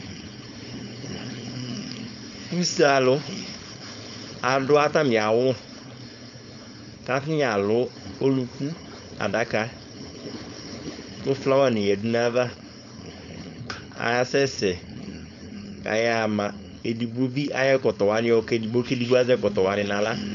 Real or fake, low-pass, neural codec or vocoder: fake; 7.2 kHz; codec, 16 kHz, 4.8 kbps, FACodec